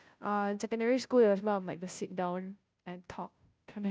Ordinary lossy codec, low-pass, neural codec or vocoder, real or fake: none; none; codec, 16 kHz, 0.5 kbps, FunCodec, trained on Chinese and English, 25 frames a second; fake